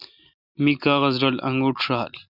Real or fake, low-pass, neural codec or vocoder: real; 5.4 kHz; none